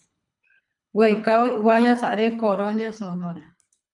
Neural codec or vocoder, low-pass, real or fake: codec, 24 kHz, 3 kbps, HILCodec; 10.8 kHz; fake